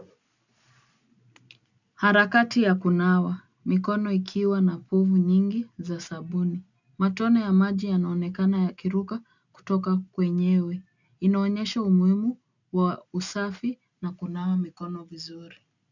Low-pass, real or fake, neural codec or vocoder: 7.2 kHz; real; none